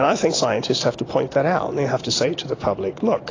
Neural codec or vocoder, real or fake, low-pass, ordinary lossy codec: none; real; 7.2 kHz; AAC, 32 kbps